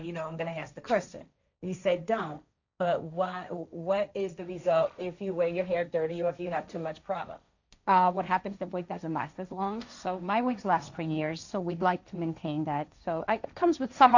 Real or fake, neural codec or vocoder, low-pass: fake; codec, 16 kHz, 1.1 kbps, Voila-Tokenizer; 7.2 kHz